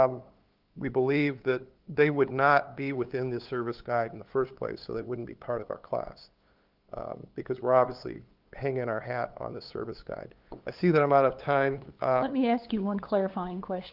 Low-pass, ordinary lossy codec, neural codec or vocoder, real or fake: 5.4 kHz; Opus, 32 kbps; codec, 16 kHz, 8 kbps, FunCodec, trained on LibriTTS, 25 frames a second; fake